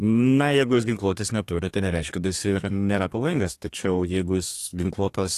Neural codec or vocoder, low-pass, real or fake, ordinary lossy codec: codec, 32 kHz, 1.9 kbps, SNAC; 14.4 kHz; fake; AAC, 64 kbps